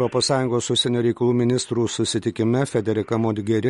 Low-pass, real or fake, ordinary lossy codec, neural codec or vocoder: 19.8 kHz; real; MP3, 48 kbps; none